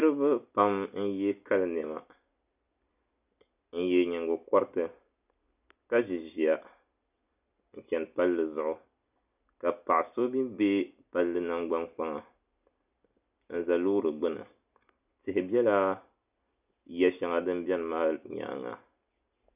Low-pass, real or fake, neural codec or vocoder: 3.6 kHz; real; none